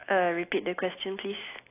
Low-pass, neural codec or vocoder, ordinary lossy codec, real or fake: 3.6 kHz; none; AAC, 24 kbps; real